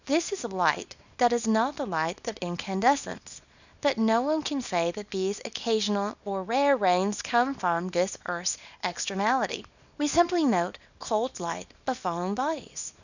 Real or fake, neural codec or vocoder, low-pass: fake; codec, 24 kHz, 0.9 kbps, WavTokenizer, small release; 7.2 kHz